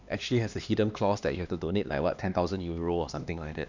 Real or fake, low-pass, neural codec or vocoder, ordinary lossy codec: fake; 7.2 kHz; codec, 16 kHz, 2 kbps, X-Codec, HuBERT features, trained on LibriSpeech; none